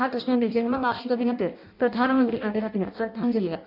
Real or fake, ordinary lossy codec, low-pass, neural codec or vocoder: fake; none; 5.4 kHz; codec, 16 kHz in and 24 kHz out, 0.6 kbps, FireRedTTS-2 codec